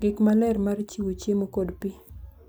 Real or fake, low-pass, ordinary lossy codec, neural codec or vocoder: real; none; none; none